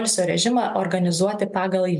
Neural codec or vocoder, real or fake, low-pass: none; real; 10.8 kHz